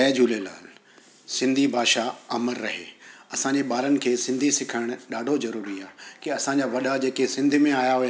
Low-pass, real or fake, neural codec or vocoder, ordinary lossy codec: none; real; none; none